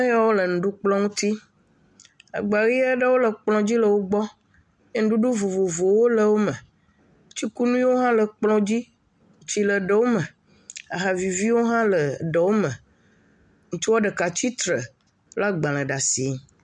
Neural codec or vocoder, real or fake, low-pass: none; real; 10.8 kHz